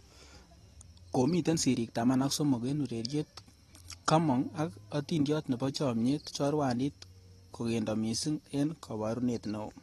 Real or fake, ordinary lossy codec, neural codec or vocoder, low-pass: real; AAC, 32 kbps; none; 19.8 kHz